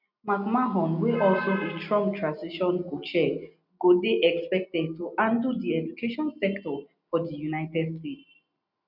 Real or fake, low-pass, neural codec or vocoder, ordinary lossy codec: real; 5.4 kHz; none; none